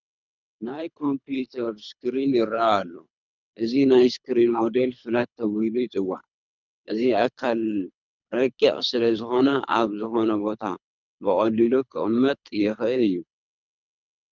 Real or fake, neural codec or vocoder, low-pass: fake; codec, 24 kHz, 3 kbps, HILCodec; 7.2 kHz